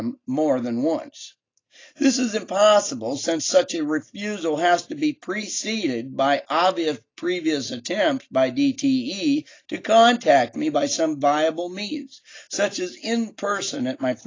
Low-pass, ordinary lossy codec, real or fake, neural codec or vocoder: 7.2 kHz; AAC, 32 kbps; real; none